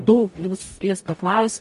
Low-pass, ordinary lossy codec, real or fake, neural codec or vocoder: 14.4 kHz; MP3, 48 kbps; fake; codec, 44.1 kHz, 0.9 kbps, DAC